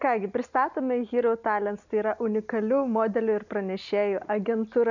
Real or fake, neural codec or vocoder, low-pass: real; none; 7.2 kHz